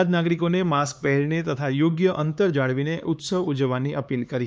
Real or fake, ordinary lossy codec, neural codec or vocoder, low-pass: fake; none; codec, 16 kHz, 4 kbps, X-Codec, HuBERT features, trained on LibriSpeech; none